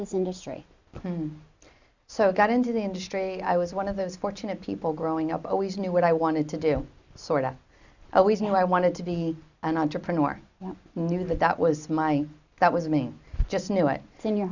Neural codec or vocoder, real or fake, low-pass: none; real; 7.2 kHz